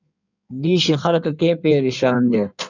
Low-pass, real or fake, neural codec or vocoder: 7.2 kHz; fake; codec, 16 kHz in and 24 kHz out, 1.1 kbps, FireRedTTS-2 codec